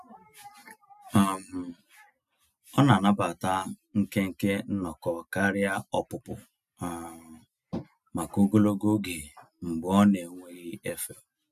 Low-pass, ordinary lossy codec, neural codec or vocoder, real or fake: 14.4 kHz; none; none; real